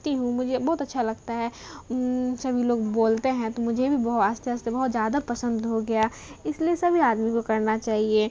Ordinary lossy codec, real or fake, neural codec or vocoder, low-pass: none; real; none; none